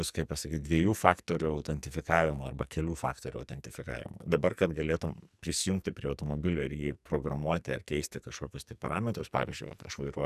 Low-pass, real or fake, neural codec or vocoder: 14.4 kHz; fake; codec, 44.1 kHz, 2.6 kbps, SNAC